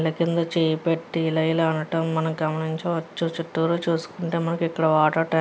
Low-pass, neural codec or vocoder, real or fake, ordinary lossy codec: none; none; real; none